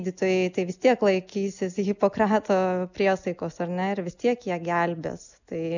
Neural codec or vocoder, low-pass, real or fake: none; 7.2 kHz; real